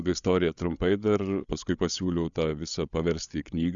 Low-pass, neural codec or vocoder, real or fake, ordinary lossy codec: 7.2 kHz; codec, 16 kHz, 8 kbps, FreqCodec, larger model; fake; Opus, 64 kbps